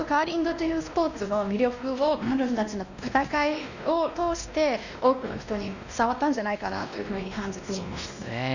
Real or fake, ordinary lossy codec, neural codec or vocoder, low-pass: fake; none; codec, 16 kHz, 1 kbps, X-Codec, WavLM features, trained on Multilingual LibriSpeech; 7.2 kHz